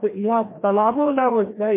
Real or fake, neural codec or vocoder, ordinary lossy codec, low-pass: fake; codec, 16 kHz, 1 kbps, FreqCodec, larger model; MP3, 24 kbps; 3.6 kHz